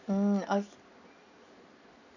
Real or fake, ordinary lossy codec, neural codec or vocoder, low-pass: real; none; none; 7.2 kHz